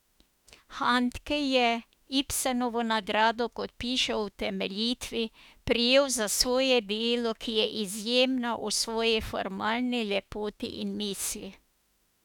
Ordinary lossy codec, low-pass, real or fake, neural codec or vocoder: none; 19.8 kHz; fake; autoencoder, 48 kHz, 32 numbers a frame, DAC-VAE, trained on Japanese speech